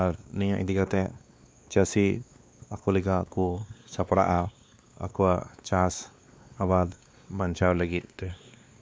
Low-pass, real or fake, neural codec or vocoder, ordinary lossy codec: none; fake; codec, 16 kHz, 2 kbps, X-Codec, WavLM features, trained on Multilingual LibriSpeech; none